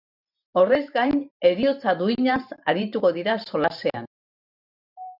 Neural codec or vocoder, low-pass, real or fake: vocoder, 44.1 kHz, 128 mel bands every 512 samples, BigVGAN v2; 5.4 kHz; fake